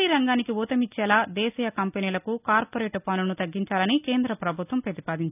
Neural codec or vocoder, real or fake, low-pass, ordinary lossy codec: none; real; 3.6 kHz; none